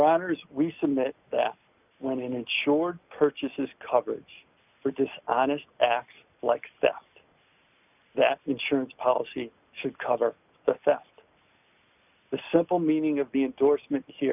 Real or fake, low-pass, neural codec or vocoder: real; 3.6 kHz; none